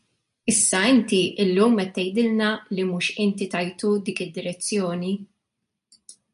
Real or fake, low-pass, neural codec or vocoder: real; 10.8 kHz; none